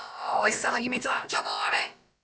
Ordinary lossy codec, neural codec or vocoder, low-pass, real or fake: none; codec, 16 kHz, about 1 kbps, DyCAST, with the encoder's durations; none; fake